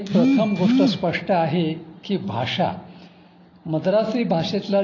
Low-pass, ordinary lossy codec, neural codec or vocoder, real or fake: 7.2 kHz; AAC, 32 kbps; none; real